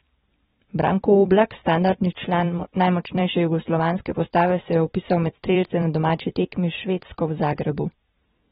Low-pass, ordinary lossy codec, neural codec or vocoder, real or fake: 19.8 kHz; AAC, 16 kbps; vocoder, 44.1 kHz, 128 mel bands every 256 samples, BigVGAN v2; fake